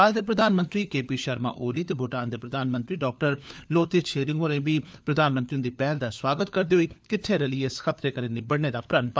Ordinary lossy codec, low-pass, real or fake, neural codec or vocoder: none; none; fake; codec, 16 kHz, 4 kbps, FunCodec, trained on LibriTTS, 50 frames a second